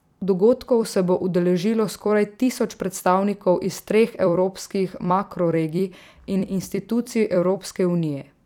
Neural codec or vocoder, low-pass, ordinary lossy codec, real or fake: vocoder, 44.1 kHz, 128 mel bands every 256 samples, BigVGAN v2; 19.8 kHz; none; fake